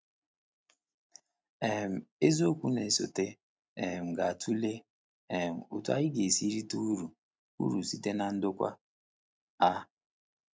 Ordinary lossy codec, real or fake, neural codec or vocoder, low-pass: none; real; none; none